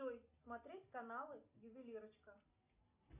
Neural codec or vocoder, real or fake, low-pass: none; real; 3.6 kHz